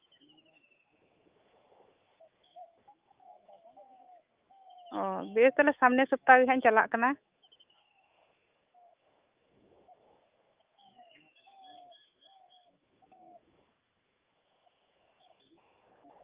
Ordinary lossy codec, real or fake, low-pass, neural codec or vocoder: Opus, 32 kbps; fake; 3.6 kHz; autoencoder, 48 kHz, 128 numbers a frame, DAC-VAE, trained on Japanese speech